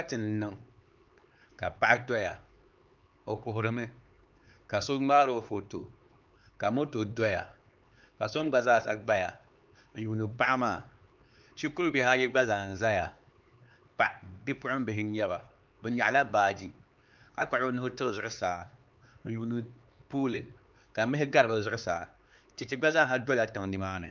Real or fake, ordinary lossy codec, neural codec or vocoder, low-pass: fake; Opus, 24 kbps; codec, 16 kHz, 4 kbps, X-Codec, HuBERT features, trained on LibriSpeech; 7.2 kHz